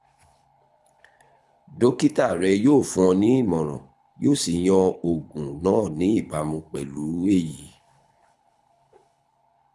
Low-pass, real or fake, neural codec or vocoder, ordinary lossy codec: none; fake; codec, 24 kHz, 6 kbps, HILCodec; none